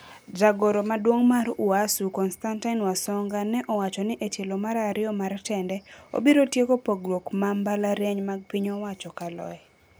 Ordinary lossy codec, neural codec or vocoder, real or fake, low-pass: none; none; real; none